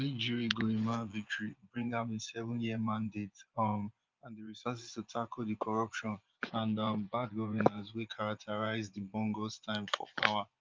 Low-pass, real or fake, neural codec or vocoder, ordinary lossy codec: 7.2 kHz; fake; vocoder, 24 kHz, 100 mel bands, Vocos; Opus, 24 kbps